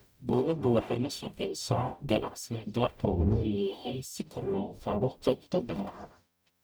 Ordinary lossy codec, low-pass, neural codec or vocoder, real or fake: none; none; codec, 44.1 kHz, 0.9 kbps, DAC; fake